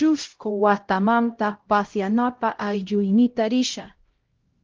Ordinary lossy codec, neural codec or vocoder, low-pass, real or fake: Opus, 16 kbps; codec, 16 kHz, 0.5 kbps, X-Codec, HuBERT features, trained on LibriSpeech; 7.2 kHz; fake